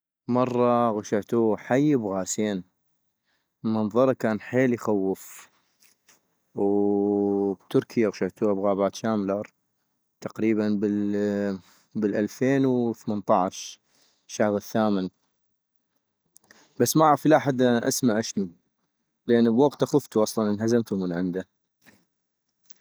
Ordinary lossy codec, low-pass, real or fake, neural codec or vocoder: none; none; real; none